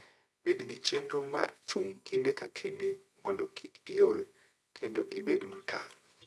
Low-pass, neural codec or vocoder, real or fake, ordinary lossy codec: none; codec, 24 kHz, 0.9 kbps, WavTokenizer, medium music audio release; fake; none